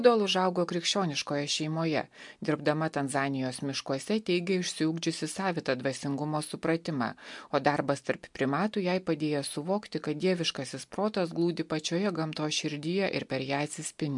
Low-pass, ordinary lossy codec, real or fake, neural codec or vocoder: 10.8 kHz; MP3, 64 kbps; real; none